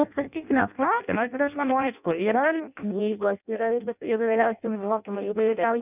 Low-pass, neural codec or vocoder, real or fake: 3.6 kHz; codec, 16 kHz in and 24 kHz out, 0.6 kbps, FireRedTTS-2 codec; fake